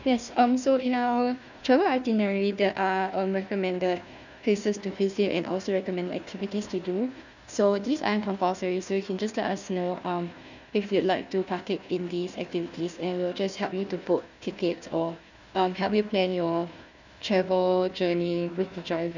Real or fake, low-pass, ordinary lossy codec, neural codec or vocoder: fake; 7.2 kHz; none; codec, 16 kHz, 1 kbps, FunCodec, trained on Chinese and English, 50 frames a second